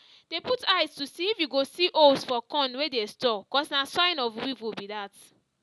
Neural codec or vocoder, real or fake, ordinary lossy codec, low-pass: none; real; none; none